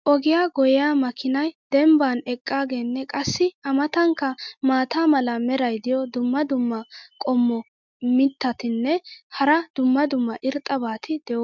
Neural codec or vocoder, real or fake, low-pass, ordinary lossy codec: none; real; 7.2 kHz; MP3, 64 kbps